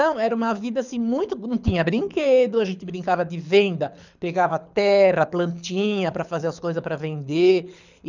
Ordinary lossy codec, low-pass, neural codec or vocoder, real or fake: none; 7.2 kHz; codec, 24 kHz, 6 kbps, HILCodec; fake